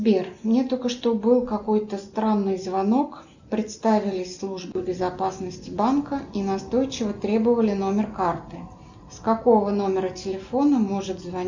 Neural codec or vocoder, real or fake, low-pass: none; real; 7.2 kHz